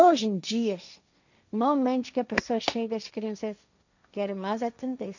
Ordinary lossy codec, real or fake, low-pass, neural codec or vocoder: none; fake; 7.2 kHz; codec, 16 kHz, 1.1 kbps, Voila-Tokenizer